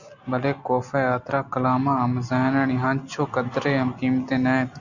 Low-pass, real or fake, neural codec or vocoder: 7.2 kHz; real; none